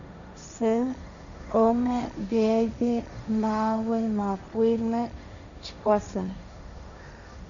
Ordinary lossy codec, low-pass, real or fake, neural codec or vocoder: none; 7.2 kHz; fake; codec, 16 kHz, 1.1 kbps, Voila-Tokenizer